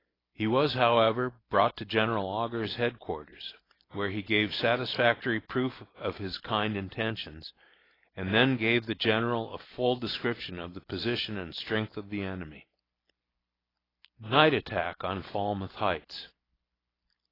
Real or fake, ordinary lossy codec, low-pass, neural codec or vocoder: real; AAC, 24 kbps; 5.4 kHz; none